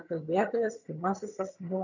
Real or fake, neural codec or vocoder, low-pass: fake; vocoder, 22.05 kHz, 80 mel bands, HiFi-GAN; 7.2 kHz